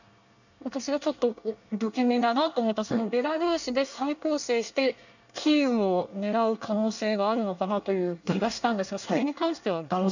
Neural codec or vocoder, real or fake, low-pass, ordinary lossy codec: codec, 24 kHz, 1 kbps, SNAC; fake; 7.2 kHz; none